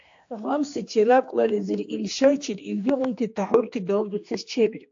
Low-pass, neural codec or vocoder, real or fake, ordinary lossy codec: 7.2 kHz; codec, 16 kHz, 2 kbps, X-Codec, HuBERT features, trained on general audio; fake; AAC, 48 kbps